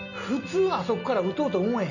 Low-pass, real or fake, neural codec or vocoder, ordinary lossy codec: 7.2 kHz; real; none; none